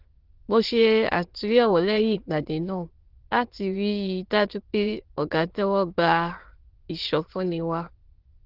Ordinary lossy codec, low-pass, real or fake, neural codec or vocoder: Opus, 16 kbps; 5.4 kHz; fake; autoencoder, 22.05 kHz, a latent of 192 numbers a frame, VITS, trained on many speakers